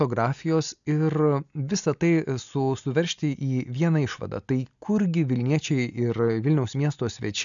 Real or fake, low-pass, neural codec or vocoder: real; 7.2 kHz; none